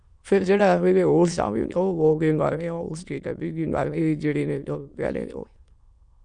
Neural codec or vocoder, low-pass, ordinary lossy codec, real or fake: autoencoder, 22.05 kHz, a latent of 192 numbers a frame, VITS, trained on many speakers; 9.9 kHz; Opus, 64 kbps; fake